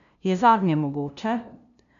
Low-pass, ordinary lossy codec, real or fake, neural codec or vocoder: 7.2 kHz; none; fake; codec, 16 kHz, 0.5 kbps, FunCodec, trained on LibriTTS, 25 frames a second